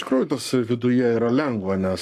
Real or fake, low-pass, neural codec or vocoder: fake; 14.4 kHz; codec, 44.1 kHz, 7.8 kbps, Pupu-Codec